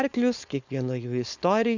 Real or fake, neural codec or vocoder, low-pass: fake; codec, 16 kHz, 4.8 kbps, FACodec; 7.2 kHz